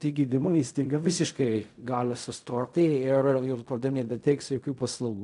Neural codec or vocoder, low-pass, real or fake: codec, 16 kHz in and 24 kHz out, 0.4 kbps, LongCat-Audio-Codec, fine tuned four codebook decoder; 10.8 kHz; fake